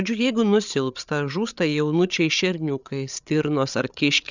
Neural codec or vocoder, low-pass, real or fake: codec, 16 kHz, 8 kbps, FreqCodec, larger model; 7.2 kHz; fake